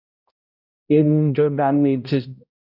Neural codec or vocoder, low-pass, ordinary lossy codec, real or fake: codec, 16 kHz, 0.5 kbps, X-Codec, HuBERT features, trained on balanced general audio; 5.4 kHz; AAC, 48 kbps; fake